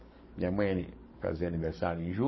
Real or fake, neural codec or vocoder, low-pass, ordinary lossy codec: fake; codec, 44.1 kHz, 7.8 kbps, DAC; 7.2 kHz; MP3, 24 kbps